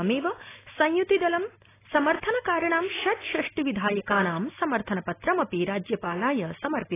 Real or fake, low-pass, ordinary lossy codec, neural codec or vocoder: real; 3.6 kHz; AAC, 16 kbps; none